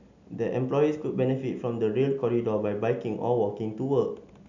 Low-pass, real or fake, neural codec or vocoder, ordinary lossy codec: 7.2 kHz; real; none; none